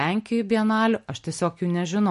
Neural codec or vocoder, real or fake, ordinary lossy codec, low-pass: none; real; MP3, 48 kbps; 14.4 kHz